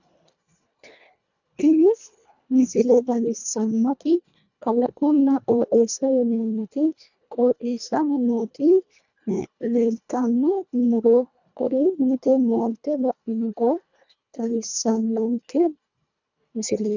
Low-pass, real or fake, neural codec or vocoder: 7.2 kHz; fake; codec, 24 kHz, 1.5 kbps, HILCodec